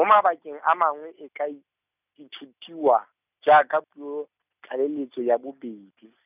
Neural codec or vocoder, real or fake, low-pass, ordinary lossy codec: none; real; 3.6 kHz; none